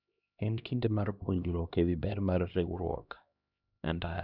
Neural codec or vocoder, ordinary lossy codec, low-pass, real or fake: codec, 16 kHz, 1 kbps, X-Codec, HuBERT features, trained on LibriSpeech; none; 5.4 kHz; fake